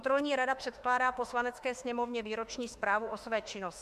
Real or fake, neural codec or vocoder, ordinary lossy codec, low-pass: fake; autoencoder, 48 kHz, 32 numbers a frame, DAC-VAE, trained on Japanese speech; MP3, 96 kbps; 14.4 kHz